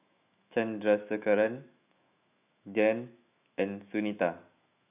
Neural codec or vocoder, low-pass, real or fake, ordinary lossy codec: autoencoder, 48 kHz, 128 numbers a frame, DAC-VAE, trained on Japanese speech; 3.6 kHz; fake; none